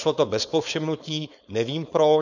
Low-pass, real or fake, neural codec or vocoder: 7.2 kHz; fake; codec, 16 kHz, 4.8 kbps, FACodec